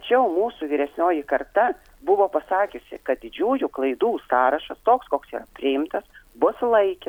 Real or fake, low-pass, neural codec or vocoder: real; 19.8 kHz; none